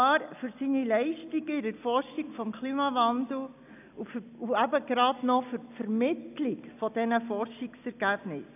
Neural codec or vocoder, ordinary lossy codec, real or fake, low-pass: none; none; real; 3.6 kHz